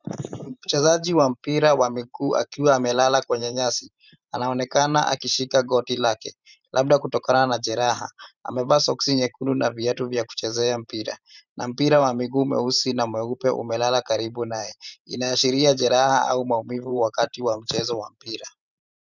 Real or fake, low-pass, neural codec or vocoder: fake; 7.2 kHz; vocoder, 44.1 kHz, 128 mel bands every 512 samples, BigVGAN v2